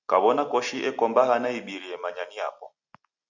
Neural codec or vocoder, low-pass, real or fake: none; 7.2 kHz; real